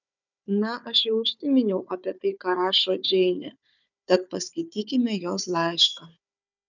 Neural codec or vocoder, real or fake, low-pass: codec, 16 kHz, 4 kbps, FunCodec, trained on Chinese and English, 50 frames a second; fake; 7.2 kHz